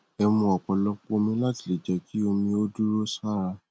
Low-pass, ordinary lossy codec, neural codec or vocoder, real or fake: none; none; none; real